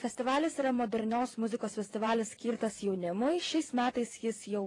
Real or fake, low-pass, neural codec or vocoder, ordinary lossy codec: fake; 10.8 kHz; vocoder, 24 kHz, 100 mel bands, Vocos; AAC, 32 kbps